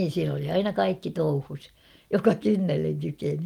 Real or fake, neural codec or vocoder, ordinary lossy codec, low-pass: real; none; Opus, 32 kbps; 19.8 kHz